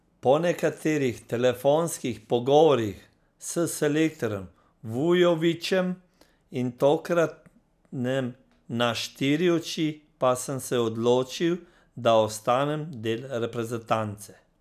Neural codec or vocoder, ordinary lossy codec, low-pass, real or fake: none; none; 14.4 kHz; real